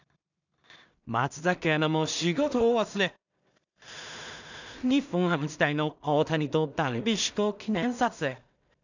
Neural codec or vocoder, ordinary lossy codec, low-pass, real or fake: codec, 16 kHz in and 24 kHz out, 0.4 kbps, LongCat-Audio-Codec, two codebook decoder; none; 7.2 kHz; fake